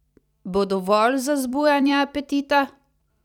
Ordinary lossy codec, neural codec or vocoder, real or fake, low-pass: none; vocoder, 44.1 kHz, 128 mel bands every 256 samples, BigVGAN v2; fake; 19.8 kHz